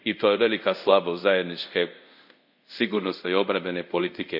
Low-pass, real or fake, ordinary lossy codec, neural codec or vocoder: 5.4 kHz; fake; none; codec, 24 kHz, 0.5 kbps, DualCodec